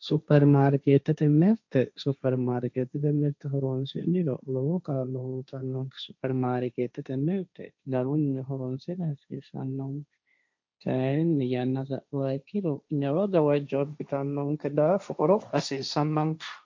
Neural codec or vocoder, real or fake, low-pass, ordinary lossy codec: codec, 16 kHz, 1.1 kbps, Voila-Tokenizer; fake; 7.2 kHz; MP3, 64 kbps